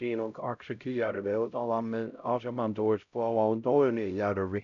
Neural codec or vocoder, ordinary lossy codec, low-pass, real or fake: codec, 16 kHz, 0.5 kbps, X-Codec, HuBERT features, trained on LibriSpeech; none; 7.2 kHz; fake